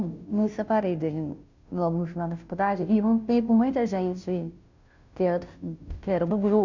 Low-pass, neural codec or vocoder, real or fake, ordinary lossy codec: 7.2 kHz; codec, 16 kHz, 0.5 kbps, FunCodec, trained on Chinese and English, 25 frames a second; fake; none